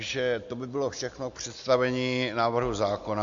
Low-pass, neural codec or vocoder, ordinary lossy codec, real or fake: 7.2 kHz; none; MP3, 48 kbps; real